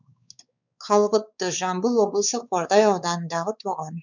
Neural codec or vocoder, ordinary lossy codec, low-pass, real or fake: codec, 16 kHz, 4 kbps, X-Codec, WavLM features, trained on Multilingual LibriSpeech; none; 7.2 kHz; fake